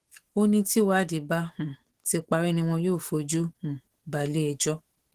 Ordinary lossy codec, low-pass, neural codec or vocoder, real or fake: Opus, 16 kbps; 14.4 kHz; autoencoder, 48 kHz, 128 numbers a frame, DAC-VAE, trained on Japanese speech; fake